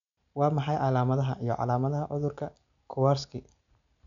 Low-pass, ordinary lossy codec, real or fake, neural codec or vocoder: 7.2 kHz; none; real; none